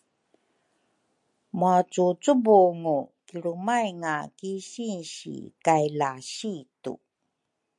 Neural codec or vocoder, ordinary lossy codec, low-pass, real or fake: none; MP3, 64 kbps; 10.8 kHz; real